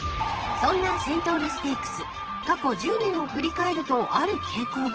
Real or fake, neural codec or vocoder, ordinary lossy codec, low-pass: fake; vocoder, 44.1 kHz, 128 mel bands, Pupu-Vocoder; Opus, 16 kbps; 7.2 kHz